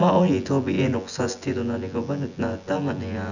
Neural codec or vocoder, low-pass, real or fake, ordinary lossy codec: vocoder, 24 kHz, 100 mel bands, Vocos; 7.2 kHz; fake; none